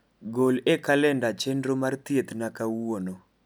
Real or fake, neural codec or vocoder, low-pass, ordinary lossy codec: real; none; none; none